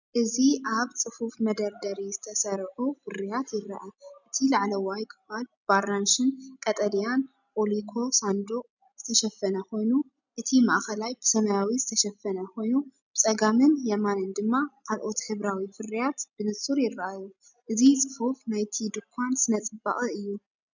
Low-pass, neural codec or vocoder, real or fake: 7.2 kHz; none; real